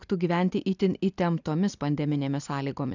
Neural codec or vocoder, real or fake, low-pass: none; real; 7.2 kHz